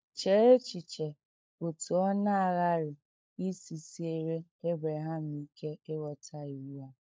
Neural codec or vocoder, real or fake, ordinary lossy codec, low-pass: codec, 16 kHz, 16 kbps, FunCodec, trained on LibriTTS, 50 frames a second; fake; none; none